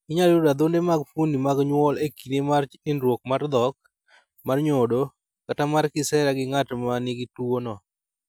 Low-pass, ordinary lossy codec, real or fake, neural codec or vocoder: none; none; real; none